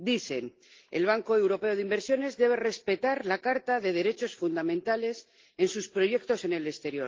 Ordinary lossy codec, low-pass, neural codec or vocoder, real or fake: Opus, 16 kbps; 7.2 kHz; none; real